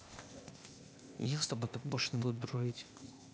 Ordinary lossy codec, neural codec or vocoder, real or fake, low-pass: none; codec, 16 kHz, 0.8 kbps, ZipCodec; fake; none